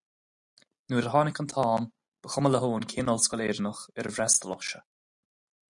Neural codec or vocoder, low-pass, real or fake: none; 10.8 kHz; real